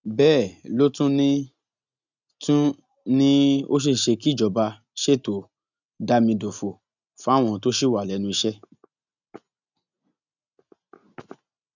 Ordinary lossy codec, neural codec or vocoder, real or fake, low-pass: none; none; real; 7.2 kHz